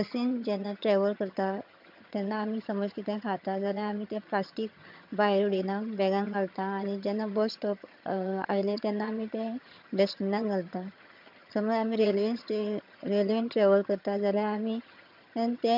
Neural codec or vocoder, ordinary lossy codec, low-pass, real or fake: vocoder, 22.05 kHz, 80 mel bands, HiFi-GAN; MP3, 48 kbps; 5.4 kHz; fake